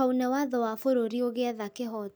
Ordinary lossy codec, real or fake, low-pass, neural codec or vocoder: none; real; none; none